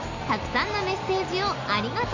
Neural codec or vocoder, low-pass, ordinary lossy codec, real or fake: none; 7.2 kHz; none; real